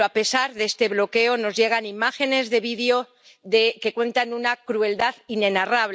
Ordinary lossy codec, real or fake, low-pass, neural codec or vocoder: none; real; none; none